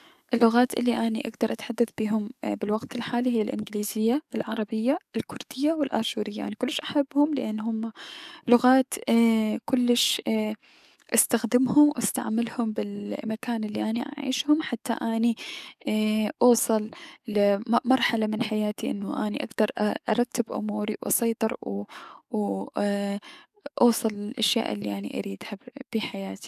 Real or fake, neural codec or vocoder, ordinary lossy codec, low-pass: fake; codec, 44.1 kHz, 7.8 kbps, DAC; none; 14.4 kHz